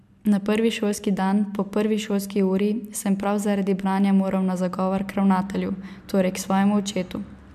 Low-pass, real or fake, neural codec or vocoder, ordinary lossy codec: 14.4 kHz; real; none; MP3, 96 kbps